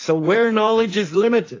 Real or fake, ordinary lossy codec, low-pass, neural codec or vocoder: fake; AAC, 32 kbps; 7.2 kHz; codec, 16 kHz in and 24 kHz out, 1.1 kbps, FireRedTTS-2 codec